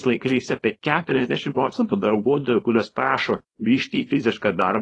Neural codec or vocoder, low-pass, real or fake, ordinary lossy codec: codec, 24 kHz, 0.9 kbps, WavTokenizer, small release; 10.8 kHz; fake; AAC, 32 kbps